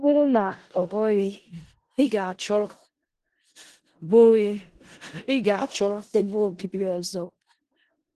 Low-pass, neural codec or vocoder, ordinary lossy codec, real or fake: 10.8 kHz; codec, 16 kHz in and 24 kHz out, 0.4 kbps, LongCat-Audio-Codec, four codebook decoder; Opus, 16 kbps; fake